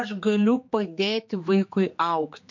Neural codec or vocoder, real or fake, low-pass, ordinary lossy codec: codec, 16 kHz, 2 kbps, X-Codec, HuBERT features, trained on general audio; fake; 7.2 kHz; MP3, 48 kbps